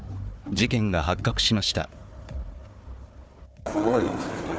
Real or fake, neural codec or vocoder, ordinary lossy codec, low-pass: fake; codec, 16 kHz, 4 kbps, FunCodec, trained on Chinese and English, 50 frames a second; none; none